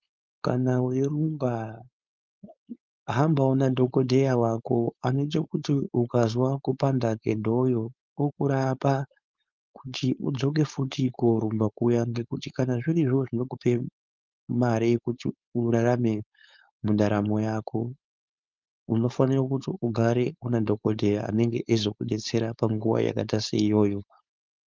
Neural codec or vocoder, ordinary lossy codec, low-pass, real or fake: codec, 16 kHz, 4.8 kbps, FACodec; Opus, 24 kbps; 7.2 kHz; fake